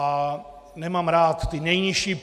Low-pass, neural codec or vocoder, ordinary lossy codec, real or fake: 14.4 kHz; none; AAC, 96 kbps; real